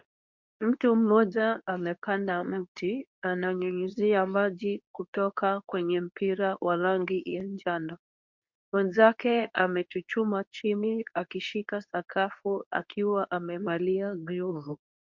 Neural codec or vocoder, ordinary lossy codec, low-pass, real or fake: codec, 24 kHz, 0.9 kbps, WavTokenizer, medium speech release version 2; MP3, 48 kbps; 7.2 kHz; fake